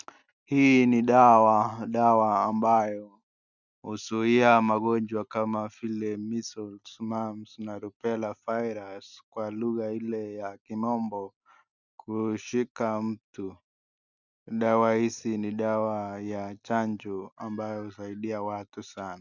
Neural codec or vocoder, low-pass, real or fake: none; 7.2 kHz; real